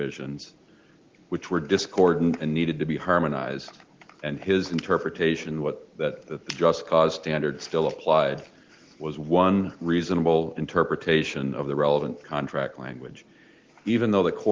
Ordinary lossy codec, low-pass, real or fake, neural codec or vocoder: Opus, 16 kbps; 7.2 kHz; real; none